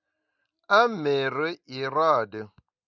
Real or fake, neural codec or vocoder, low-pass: real; none; 7.2 kHz